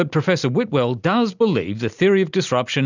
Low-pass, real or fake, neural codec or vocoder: 7.2 kHz; real; none